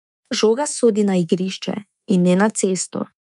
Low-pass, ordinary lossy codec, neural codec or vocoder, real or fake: 10.8 kHz; none; codec, 24 kHz, 3.1 kbps, DualCodec; fake